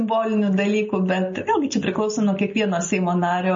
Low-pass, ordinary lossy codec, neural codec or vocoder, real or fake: 7.2 kHz; MP3, 32 kbps; none; real